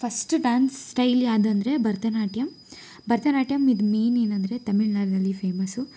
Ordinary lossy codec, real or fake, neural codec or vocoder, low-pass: none; real; none; none